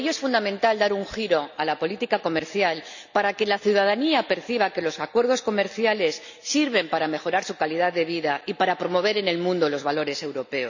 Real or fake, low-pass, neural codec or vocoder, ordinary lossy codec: real; 7.2 kHz; none; none